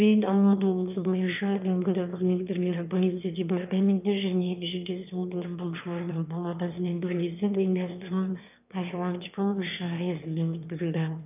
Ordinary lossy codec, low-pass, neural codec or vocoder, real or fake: none; 3.6 kHz; autoencoder, 22.05 kHz, a latent of 192 numbers a frame, VITS, trained on one speaker; fake